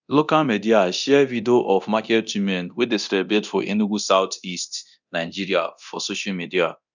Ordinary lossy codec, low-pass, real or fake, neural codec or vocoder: none; 7.2 kHz; fake; codec, 24 kHz, 0.9 kbps, DualCodec